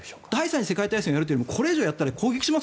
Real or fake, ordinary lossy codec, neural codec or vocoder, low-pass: real; none; none; none